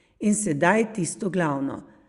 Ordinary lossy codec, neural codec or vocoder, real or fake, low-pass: Opus, 64 kbps; none; real; 10.8 kHz